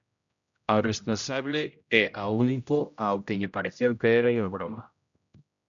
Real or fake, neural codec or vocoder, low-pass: fake; codec, 16 kHz, 0.5 kbps, X-Codec, HuBERT features, trained on general audio; 7.2 kHz